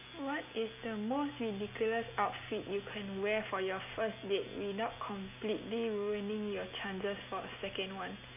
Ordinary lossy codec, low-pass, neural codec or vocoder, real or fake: none; 3.6 kHz; none; real